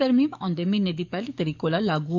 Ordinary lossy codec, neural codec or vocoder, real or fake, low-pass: none; codec, 44.1 kHz, 7.8 kbps, DAC; fake; 7.2 kHz